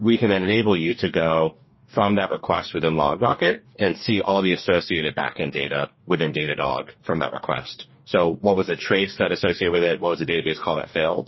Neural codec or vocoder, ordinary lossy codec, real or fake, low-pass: codec, 44.1 kHz, 2.6 kbps, DAC; MP3, 24 kbps; fake; 7.2 kHz